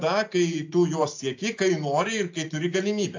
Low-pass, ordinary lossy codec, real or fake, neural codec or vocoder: 7.2 kHz; AAC, 48 kbps; real; none